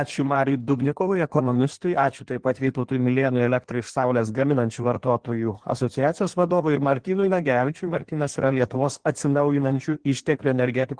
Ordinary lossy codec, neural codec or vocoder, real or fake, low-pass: Opus, 16 kbps; codec, 16 kHz in and 24 kHz out, 1.1 kbps, FireRedTTS-2 codec; fake; 9.9 kHz